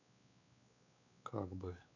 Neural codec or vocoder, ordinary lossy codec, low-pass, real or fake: codec, 16 kHz, 4 kbps, X-Codec, WavLM features, trained on Multilingual LibriSpeech; none; 7.2 kHz; fake